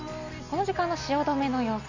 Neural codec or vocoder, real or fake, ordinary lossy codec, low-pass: none; real; none; 7.2 kHz